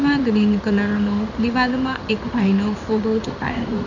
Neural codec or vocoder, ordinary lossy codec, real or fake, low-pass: codec, 16 kHz in and 24 kHz out, 1 kbps, XY-Tokenizer; none; fake; 7.2 kHz